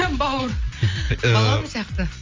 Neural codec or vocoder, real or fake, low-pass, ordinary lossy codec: none; real; 7.2 kHz; Opus, 32 kbps